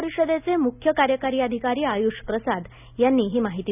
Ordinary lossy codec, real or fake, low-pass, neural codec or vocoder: none; real; 3.6 kHz; none